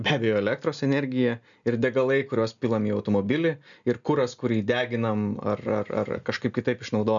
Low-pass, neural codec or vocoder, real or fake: 7.2 kHz; none; real